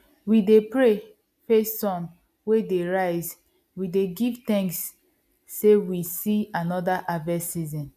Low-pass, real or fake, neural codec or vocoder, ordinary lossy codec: 14.4 kHz; real; none; none